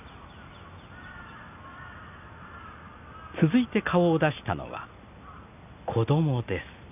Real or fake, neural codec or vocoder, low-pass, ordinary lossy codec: real; none; 3.6 kHz; none